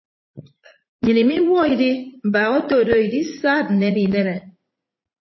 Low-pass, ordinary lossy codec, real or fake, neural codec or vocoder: 7.2 kHz; MP3, 24 kbps; fake; vocoder, 44.1 kHz, 80 mel bands, Vocos